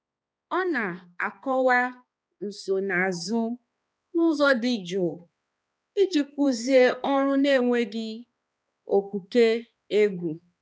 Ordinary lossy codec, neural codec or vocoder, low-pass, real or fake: none; codec, 16 kHz, 2 kbps, X-Codec, HuBERT features, trained on balanced general audio; none; fake